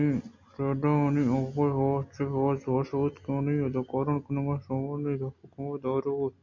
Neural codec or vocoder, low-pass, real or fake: none; 7.2 kHz; real